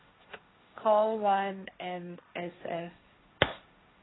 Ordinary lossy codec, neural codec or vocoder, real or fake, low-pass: AAC, 16 kbps; codec, 16 kHz, 1.1 kbps, Voila-Tokenizer; fake; 7.2 kHz